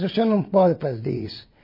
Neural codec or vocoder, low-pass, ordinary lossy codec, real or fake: vocoder, 22.05 kHz, 80 mel bands, WaveNeXt; 5.4 kHz; MP3, 24 kbps; fake